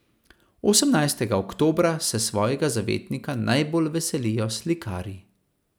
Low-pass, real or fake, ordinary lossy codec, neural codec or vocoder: none; real; none; none